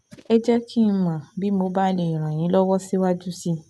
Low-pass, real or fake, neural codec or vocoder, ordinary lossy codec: none; real; none; none